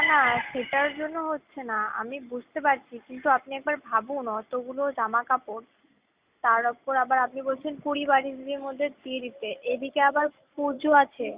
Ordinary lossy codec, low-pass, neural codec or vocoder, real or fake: Opus, 64 kbps; 3.6 kHz; none; real